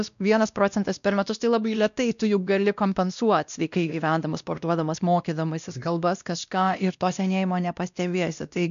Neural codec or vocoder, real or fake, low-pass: codec, 16 kHz, 1 kbps, X-Codec, WavLM features, trained on Multilingual LibriSpeech; fake; 7.2 kHz